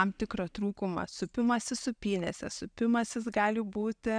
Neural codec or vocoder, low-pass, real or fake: none; 9.9 kHz; real